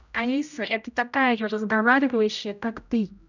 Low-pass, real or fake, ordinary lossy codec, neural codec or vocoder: 7.2 kHz; fake; none; codec, 16 kHz, 0.5 kbps, X-Codec, HuBERT features, trained on general audio